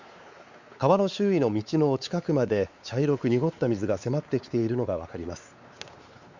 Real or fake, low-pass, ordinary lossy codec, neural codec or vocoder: fake; 7.2 kHz; Opus, 64 kbps; codec, 16 kHz, 4 kbps, X-Codec, WavLM features, trained on Multilingual LibriSpeech